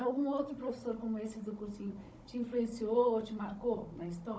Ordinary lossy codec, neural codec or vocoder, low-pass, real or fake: none; codec, 16 kHz, 16 kbps, FunCodec, trained on Chinese and English, 50 frames a second; none; fake